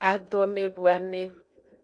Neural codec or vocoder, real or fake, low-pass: codec, 16 kHz in and 24 kHz out, 0.8 kbps, FocalCodec, streaming, 65536 codes; fake; 9.9 kHz